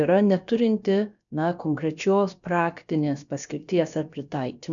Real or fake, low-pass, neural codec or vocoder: fake; 7.2 kHz; codec, 16 kHz, about 1 kbps, DyCAST, with the encoder's durations